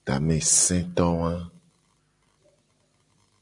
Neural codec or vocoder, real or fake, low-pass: none; real; 10.8 kHz